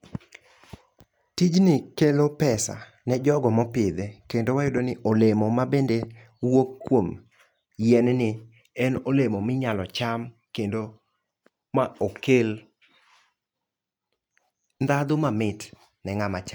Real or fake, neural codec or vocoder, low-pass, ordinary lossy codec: real; none; none; none